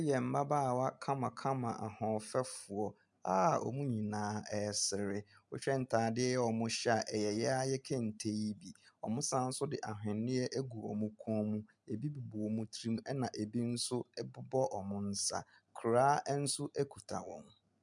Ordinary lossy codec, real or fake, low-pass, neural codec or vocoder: MP3, 96 kbps; real; 10.8 kHz; none